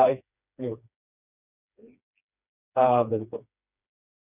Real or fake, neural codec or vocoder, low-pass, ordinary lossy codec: fake; codec, 16 kHz, 2 kbps, FreqCodec, smaller model; 3.6 kHz; none